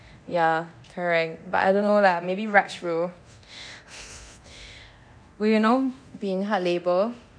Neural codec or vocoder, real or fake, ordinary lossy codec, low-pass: codec, 24 kHz, 0.9 kbps, DualCodec; fake; none; 9.9 kHz